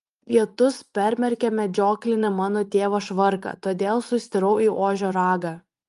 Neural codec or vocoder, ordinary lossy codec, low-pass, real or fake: vocoder, 24 kHz, 100 mel bands, Vocos; Opus, 32 kbps; 10.8 kHz; fake